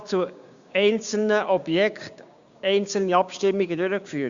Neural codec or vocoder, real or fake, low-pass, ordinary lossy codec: codec, 16 kHz, 6 kbps, DAC; fake; 7.2 kHz; Opus, 64 kbps